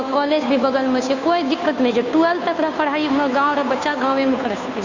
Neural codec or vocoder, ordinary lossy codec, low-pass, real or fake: codec, 16 kHz, 2 kbps, FunCodec, trained on Chinese and English, 25 frames a second; none; 7.2 kHz; fake